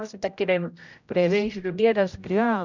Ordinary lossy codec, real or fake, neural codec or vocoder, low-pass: none; fake; codec, 16 kHz, 0.5 kbps, X-Codec, HuBERT features, trained on general audio; 7.2 kHz